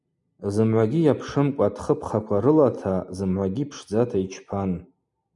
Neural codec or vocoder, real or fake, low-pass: none; real; 10.8 kHz